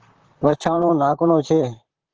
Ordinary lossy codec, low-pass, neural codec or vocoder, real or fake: Opus, 16 kbps; 7.2 kHz; vocoder, 44.1 kHz, 128 mel bands, Pupu-Vocoder; fake